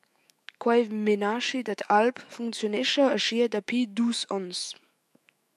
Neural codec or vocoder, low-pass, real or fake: autoencoder, 48 kHz, 128 numbers a frame, DAC-VAE, trained on Japanese speech; 14.4 kHz; fake